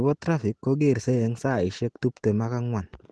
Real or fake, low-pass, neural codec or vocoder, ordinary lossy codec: real; 10.8 kHz; none; Opus, 16 kbps